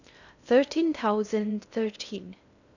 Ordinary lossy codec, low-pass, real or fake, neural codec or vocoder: none; 7.2 kHz; fake; codec, 16 kHz in and 24 kHz out, 0.6 kbps, FocalCodec, streaming, 2048 codes